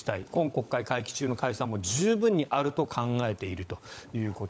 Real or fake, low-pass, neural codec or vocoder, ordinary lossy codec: fake; none; codec, 16 kHz, 16 kbps, FunCodec, trained on LibriTTS, 50 frames a second; none